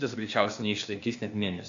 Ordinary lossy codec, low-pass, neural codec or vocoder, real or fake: AAC, 64 kbps; 7.2 kHz; codec, 16 kHz, 0.8 kbps, ZipCodec; fake